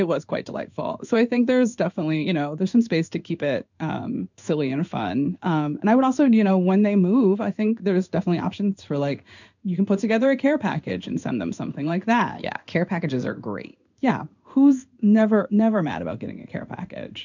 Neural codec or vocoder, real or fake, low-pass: codec, 16 kHz in and 24 kHz out, 1 kbps, XY-Tokenizer; fake; 7.2 kHz